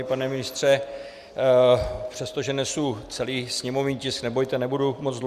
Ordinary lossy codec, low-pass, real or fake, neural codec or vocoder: Opus, 64 kbps; 14.4 kHz; real; none